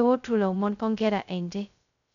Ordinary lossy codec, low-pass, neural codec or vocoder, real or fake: none; 7.2 kHz; codec, 16 kHz, 0.2 kbps, FocalCodec; fake